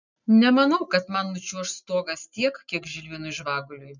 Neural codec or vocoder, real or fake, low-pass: none; real; 7.2 kHz